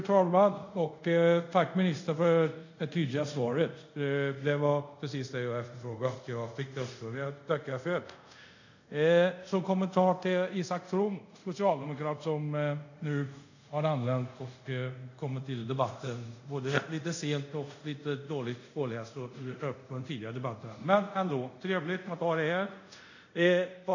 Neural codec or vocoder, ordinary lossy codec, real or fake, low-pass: codec, 24 kHz, 0.5 kbps, DualCodec; none; fake; 7.2 kHz